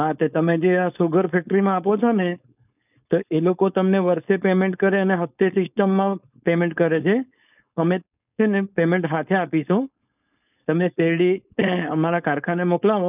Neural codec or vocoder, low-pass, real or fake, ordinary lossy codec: codec, 16 kHz, 4.8 kbps, FACodec; 3.6 kHz; fake; none